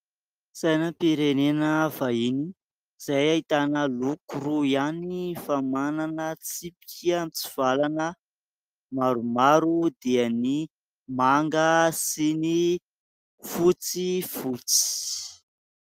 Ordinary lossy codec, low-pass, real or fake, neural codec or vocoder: Opus, 32 kbps; 14.4 kHz; real; none